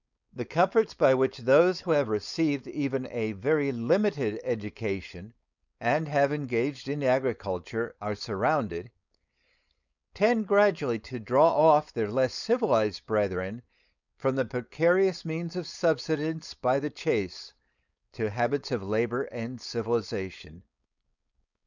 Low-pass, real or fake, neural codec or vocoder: 7.2 kHz; fake; codec, 16 kHz, 4.8 kbps, FACodec